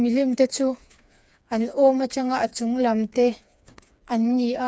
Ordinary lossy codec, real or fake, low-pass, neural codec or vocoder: none; fake; none; codec, 16 kHz, 4 kbps, FreqCodec, smaller model